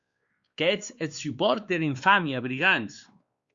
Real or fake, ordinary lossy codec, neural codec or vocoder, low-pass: fake; Opus, 64 kbps; codec, 16 kHz, 2 kbps, X-Codec, WavLM features, trained on Multilingual LibriSpeech; 7.2 kHz